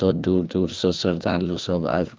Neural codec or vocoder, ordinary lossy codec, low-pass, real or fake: codec, 16 kHz, 2 kbps, FreqCodec, larger model; Opus, 24 kbps; 7.2 kHz; fake